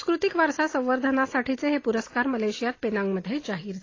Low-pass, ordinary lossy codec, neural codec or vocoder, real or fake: 7.2 kHz; AAC, 32 kbps; none; real